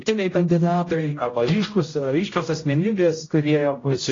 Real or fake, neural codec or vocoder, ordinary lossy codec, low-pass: fake; codec, 16 kHz, 0.5 kbps, X-Codec, HuBERT features, trained on general audio; AAC, 32 kbps; 7.2 kHz